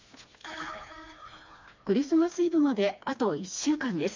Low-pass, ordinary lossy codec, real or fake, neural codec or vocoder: 7.2 kHz; MP3, 64 kbps; fake; codec, 16 kHz, 2 kbps, FreqCodec, smaller model